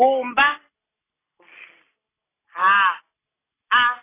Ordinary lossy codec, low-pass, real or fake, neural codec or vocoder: AAC, 16 kbps; 3.6 kHz; real; none